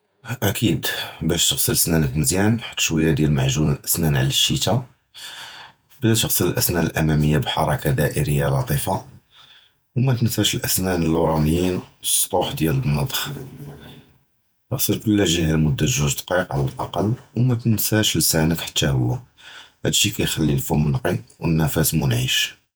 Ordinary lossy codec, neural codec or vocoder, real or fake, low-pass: none; vocoder, 48 kHz, 128 mel bands, Vocos; fake; none